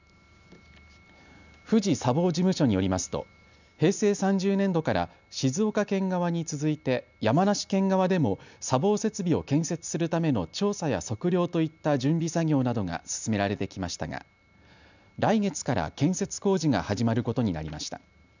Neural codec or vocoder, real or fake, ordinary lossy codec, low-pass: none; real; none; 7.2 kHz